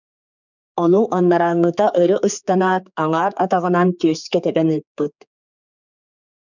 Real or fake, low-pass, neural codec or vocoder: fake; 7.2 kHz; codec, 16 kHz, 4 kbps, X-Codec, HuBERT features, trained on general audio